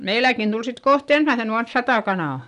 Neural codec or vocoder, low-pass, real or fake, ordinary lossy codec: none; 10.8 kHz; real; none